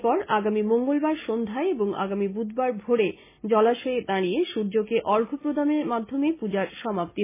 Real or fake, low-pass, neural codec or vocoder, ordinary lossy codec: real; 3.6 kHz; none; MP3, 16 kbps